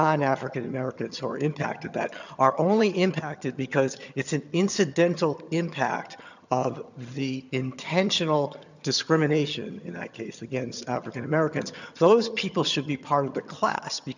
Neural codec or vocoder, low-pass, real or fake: vocoder, 22.05 kHz, 80 mel bands, HiFi-GAN; 7.2 kHz; fake